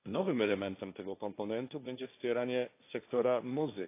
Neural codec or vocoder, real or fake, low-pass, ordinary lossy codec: codec, 16 kHz, 1.1 kbps, Voila-Tokenizer; fake; 3.6 kHz; AAC, 24 kbps